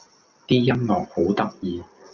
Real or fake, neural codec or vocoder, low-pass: real; none; 7.2 kHz